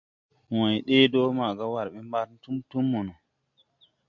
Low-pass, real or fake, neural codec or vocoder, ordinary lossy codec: 7.2 kHz; real; none; MP3, 64 kbps